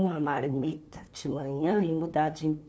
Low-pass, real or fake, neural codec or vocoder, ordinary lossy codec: none; fake; codec, 16 kHz, 2 kbps, FunCodec, trained on LibriTTS, 25 frames a second; none